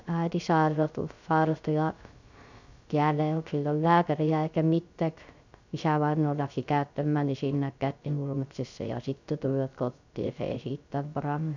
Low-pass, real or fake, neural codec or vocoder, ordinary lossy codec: 7.2 kHz; fake; codec, 16 kHz, 0.3 kbps, FocalCodec; none